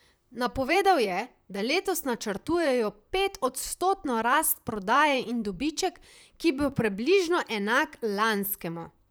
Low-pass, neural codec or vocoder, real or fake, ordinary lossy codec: none; vocoder, 44.1 kHz, 128 mel bands, Pupu-Vocoder; fake; none